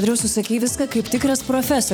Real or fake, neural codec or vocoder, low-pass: fake; vocoder, 44.1 kHz, 128 mel bands every 256 samples, BigVGAN v2; 19.8 kHz